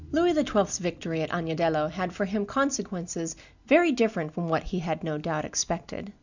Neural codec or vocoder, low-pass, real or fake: none; 7.2 kHz; real